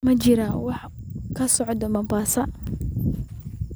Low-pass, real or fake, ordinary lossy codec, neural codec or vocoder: none; real; none; none